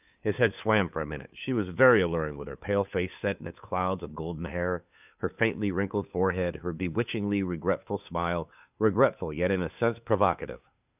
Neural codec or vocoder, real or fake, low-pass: codec, 16 kHz, 2 kbps, FunCodec, trained on Chinese and English, 25 frames a second; fake; 3.6 kHz